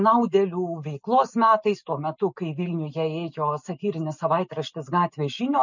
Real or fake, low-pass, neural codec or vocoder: real; 7.2 kHz; none